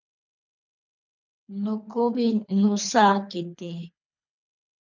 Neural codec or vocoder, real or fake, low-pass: codec, 24 kHz, 3 kbps, HILCodec; fake; 7.2 kHz